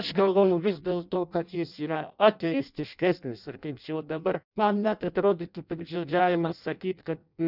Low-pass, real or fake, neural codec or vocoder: 5.4 kHz; fake; codec, 16 kHz in and 24 kHz out, 0.6 kbps, FireRedTTS-2 codec